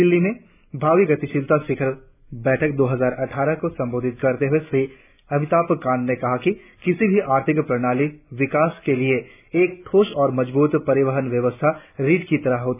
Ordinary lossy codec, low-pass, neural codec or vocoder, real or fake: none; 3.6 kHz; none; real